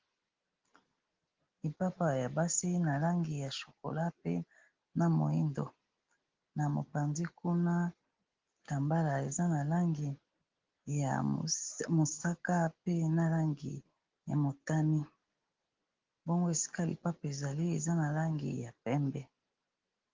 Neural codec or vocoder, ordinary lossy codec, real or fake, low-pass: none; Opus, 16 kbps; real; 7.2 kHz